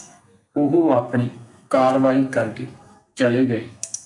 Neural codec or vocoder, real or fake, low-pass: codec, 32 kHz, 1.9 kbps, SNAC; fake; 10.8 kHz